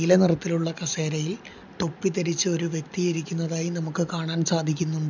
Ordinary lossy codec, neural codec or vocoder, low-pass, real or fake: none; none; 7.2 kHz; real